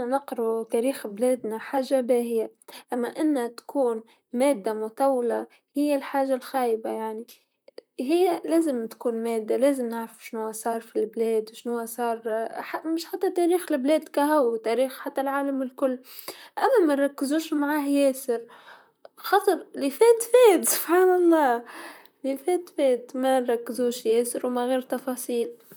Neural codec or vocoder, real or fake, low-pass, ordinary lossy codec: vocoder, 44.1 kHz, 128 mel bands, Pupu-Vocoder; fake; none; none